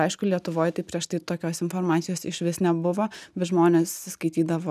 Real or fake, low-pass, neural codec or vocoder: real; 14.4 kHz; none